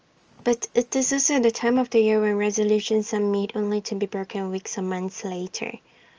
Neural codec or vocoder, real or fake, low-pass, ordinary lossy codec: none; real; 7.2 kHz; Opus, 24 kbps